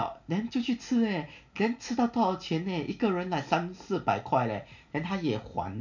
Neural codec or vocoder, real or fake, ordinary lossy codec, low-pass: none; real; none; 7.2 kHz